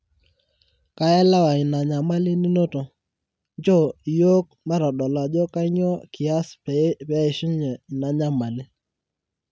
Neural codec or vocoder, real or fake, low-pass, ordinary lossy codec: none; real; none; none